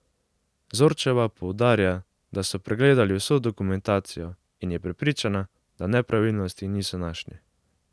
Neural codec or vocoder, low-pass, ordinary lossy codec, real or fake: none; none; none; real